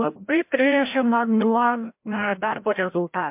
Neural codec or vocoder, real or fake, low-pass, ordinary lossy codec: codec, 16 kHz, 0.5 kbps, FreqCodec, larger model; fake; 3.6 kHz; MP3, 32 kbps